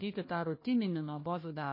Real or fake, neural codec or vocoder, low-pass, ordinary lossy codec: fake; codec, 16 kHz, 1 kbps, FunCodec, trained on Chinese and English, 50 frames a second; 5.4 kHz; MP3, 24 kbps